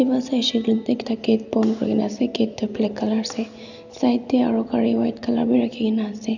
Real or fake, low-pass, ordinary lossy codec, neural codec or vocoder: real; 7.2 kHz; none; none